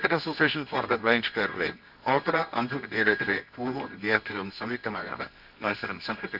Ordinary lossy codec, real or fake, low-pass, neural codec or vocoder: none; fake; 5.4 kHz; codec, 24 kHz, 0.9 kbps, WavTokenizer, medium music audio release